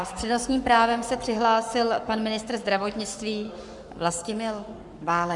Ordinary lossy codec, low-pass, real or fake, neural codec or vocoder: Opus, 64 kbps; 10.8 kHz; fake; codec, 44.1 kHz, 7.8 kbps, Pupu-Codec